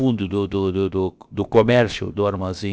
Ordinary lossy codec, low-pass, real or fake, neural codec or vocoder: none; none; fake; codec, 16 kHz, about 1 kbps, DyCAST, with the encoder's durations